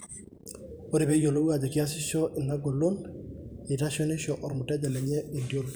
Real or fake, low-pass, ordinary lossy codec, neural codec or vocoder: fake; none; none; vocoder, 44.1 kHz, 128 mel bands every 512 samples, BigVGAN v2